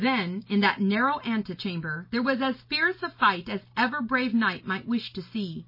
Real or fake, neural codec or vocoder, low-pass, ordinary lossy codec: real; none; 5.4 kHz; MP3, 24 kbps